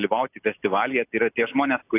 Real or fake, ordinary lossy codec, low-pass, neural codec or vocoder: real; AAC, 32 kbps; 3.6 kHz; none